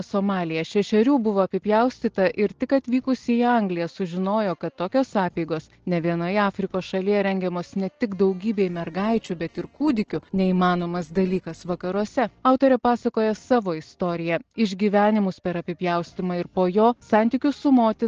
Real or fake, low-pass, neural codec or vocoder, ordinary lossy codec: real; 7.2 kHz; none; Opus, 16 kbps